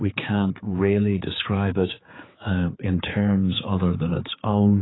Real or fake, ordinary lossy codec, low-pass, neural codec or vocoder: fake; AAC, 16 kbps; 7.2 kHz; codec, 16 kHz, 4 kbps, X-Codec, HuBERT features, trained on general audio